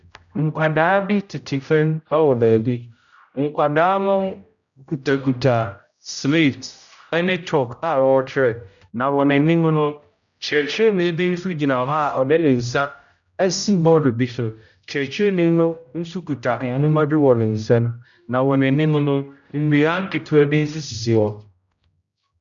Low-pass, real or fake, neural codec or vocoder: 7.2 kHz; fake; codec, 16 kHz, 0.5 kbps, X-Codec, HuBERT features, trained on general audio